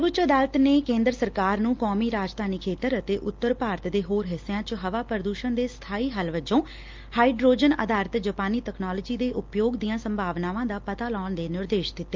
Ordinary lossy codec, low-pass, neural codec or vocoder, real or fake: Opus, 32 kbps; 7.2 kHz; none; real